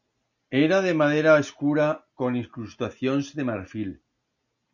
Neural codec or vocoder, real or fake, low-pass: none; real; 7.2 kHz